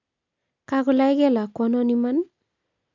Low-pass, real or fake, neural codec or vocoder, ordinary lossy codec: 7.2 kHz; real; none; none